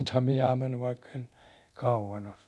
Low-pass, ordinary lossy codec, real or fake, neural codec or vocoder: none; none; fake; codec, 24 kHz, 0.9 kbps, DualCodec